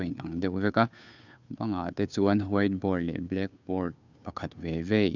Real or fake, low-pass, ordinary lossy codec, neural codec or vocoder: fake; 7.2 kHz; none; codec, 16 kHz, 2 kbps, FunCodec, trained on Chinese and English, 25 frames a second